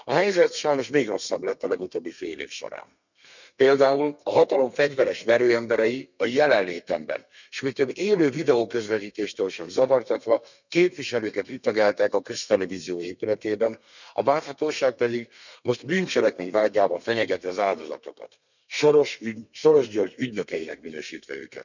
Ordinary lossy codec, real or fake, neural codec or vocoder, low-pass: none; fake; codec, 32 kHz, 1.9 kbps, SNAC; 7.2 kHz